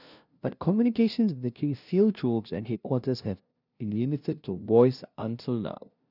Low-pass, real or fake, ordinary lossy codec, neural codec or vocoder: 5.4 kHz; fake; none; codec, 16 kHz, 0.5 kbps, FunCodec, trained on LibriTTS, 25 frames a second